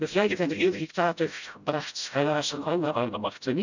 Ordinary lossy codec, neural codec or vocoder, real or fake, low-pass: none; codec, 16 kHz, 0.5 kbps, FreqCodec, smaller model; fake; 7.2 kHz